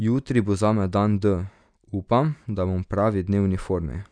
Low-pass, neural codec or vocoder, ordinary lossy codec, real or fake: 9.9 kHz; none; none; real